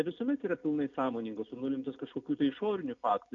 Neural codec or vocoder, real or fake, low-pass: none; real; 7.2 kHz